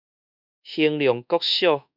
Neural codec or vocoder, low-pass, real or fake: codec, 24 kHz, 1.2 kbps, DualCodec; 5.4 kHz; fake